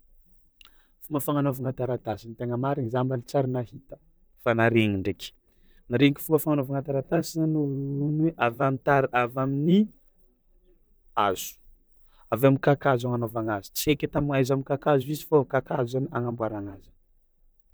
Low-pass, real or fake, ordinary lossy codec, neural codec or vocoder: none; fake; none; vocoder, 44.1 kHz, 128 mel bands, Pupu-Vocoder